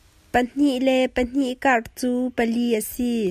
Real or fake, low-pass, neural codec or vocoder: real; 14.4 kHz; none